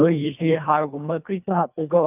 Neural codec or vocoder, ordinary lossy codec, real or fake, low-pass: codec, 24 kHz, 1.5 kbps, HILCodec; none; fake; 3.6 kHz